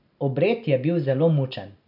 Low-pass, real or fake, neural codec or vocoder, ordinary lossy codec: 5.4 kHz; real; none; none